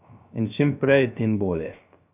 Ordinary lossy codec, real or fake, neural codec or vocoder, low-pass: none; fake; codec, 16 kHz, 0.3 kbps, FocalCodec; 3.6 kHz